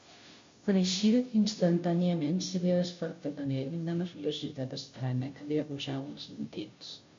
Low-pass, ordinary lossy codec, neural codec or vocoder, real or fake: 7.2 kHz; MP3, 48 kbps; codec, 16 kHz, 0.5 kbps, FunCodec, trained on Chinese and English, 25 frames a second; fake